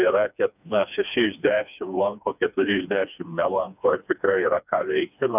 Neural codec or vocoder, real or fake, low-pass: codec, 16 kHz, 2 kbps, FreqCodec, smaller model; fake; 3.6 kHz